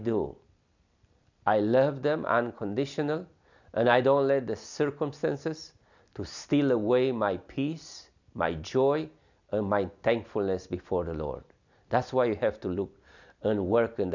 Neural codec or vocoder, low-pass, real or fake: none; 7.2 kHz; real